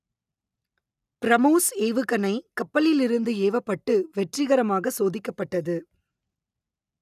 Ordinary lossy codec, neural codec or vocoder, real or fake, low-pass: none; none; real; 14.4 kHz